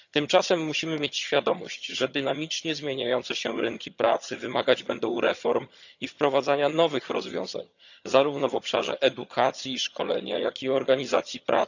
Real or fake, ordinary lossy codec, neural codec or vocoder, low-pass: fake; none; vocoder, 22.05 kHz, 80 mel bands, HiFi-GAN; 7.2 kHz